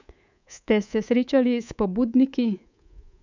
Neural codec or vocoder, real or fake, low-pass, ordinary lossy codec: codec, 24 kHz, 3.1 kbps, DualCodec; fake; 7.2 kHz; none